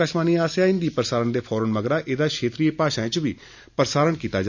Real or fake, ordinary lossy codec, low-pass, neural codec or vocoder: real; none; 7.2 kHz; none